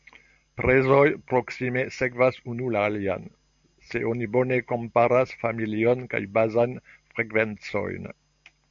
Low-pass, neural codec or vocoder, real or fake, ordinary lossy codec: 7.2 kHz; none; real; AAC, 64 kbps